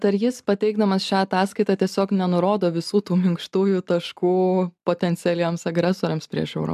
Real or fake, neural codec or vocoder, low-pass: real; none; 14.4 kHz